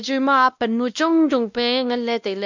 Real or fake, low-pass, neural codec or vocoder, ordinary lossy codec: fake; 7.2 kHz; codec, 16 kHz, 1 kbps, X-Codec, WavLM features, trained on Multilingual LibriSpeech; none